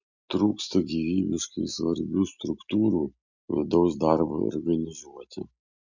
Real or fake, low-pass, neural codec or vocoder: real; 7.2 kHz; none